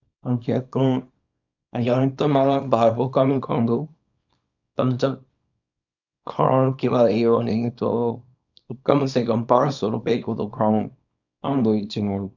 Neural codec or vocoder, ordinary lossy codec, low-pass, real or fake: codec, 24 kHz, 0.9 kbps, WavTokenizer, small release; none; 7.2 kHz; fake